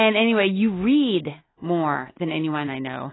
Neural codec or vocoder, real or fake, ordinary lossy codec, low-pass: none; real; AAC, 16 kbps; 7.2 kHz